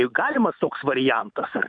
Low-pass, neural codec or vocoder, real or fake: 9.9 kHz; autoencoder, 48 kHz, 128 numbers a frame, DAC-VAE, trained on Japanese speech; fake